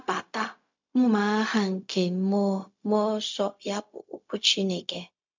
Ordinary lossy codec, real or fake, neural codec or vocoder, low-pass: MP3, 64 kbps; fake; codec, 16 kHz, 0.4 kbps, LongCat-Audio-Codec; 7.2 kHz